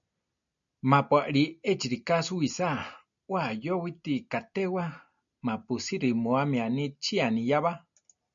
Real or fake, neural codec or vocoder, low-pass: real; none; 7.2 kHz